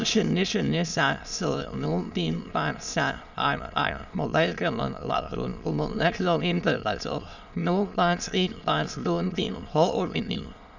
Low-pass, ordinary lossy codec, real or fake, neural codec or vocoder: 7.2 kHz; none; fake; autoencoder, 22.05 kHz, a latent of 192 numbers a frame, VITS, trained on many speakers